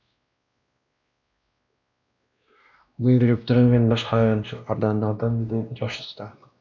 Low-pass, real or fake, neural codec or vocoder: 7.2 kHz; fake; codec, 16 kHz, 1 kbps, X-Codec, WavLM features, trained on Multilingual LibriSpeech